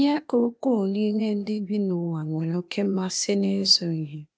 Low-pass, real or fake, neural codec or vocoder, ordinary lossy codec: none; fake; codec, 16 kHz, 0.8 kbps, ZipCodec; none